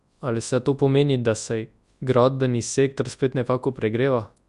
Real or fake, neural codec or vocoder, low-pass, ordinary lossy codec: fake; codec, 24 kHz, 0.9 kbps, WavTokenizer, large speech release; 10.8 kHz; Opus, 64 kbps